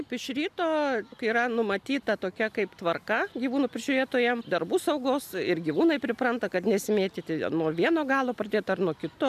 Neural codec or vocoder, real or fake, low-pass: none; real; 14.4 kHz